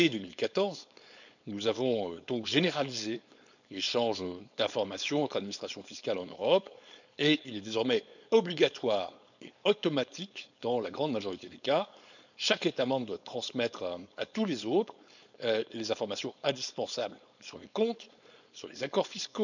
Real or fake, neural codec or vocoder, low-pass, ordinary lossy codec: fake; codec, 16 kHz, 4.8 kbps, FACodec; 7.2 kHz; none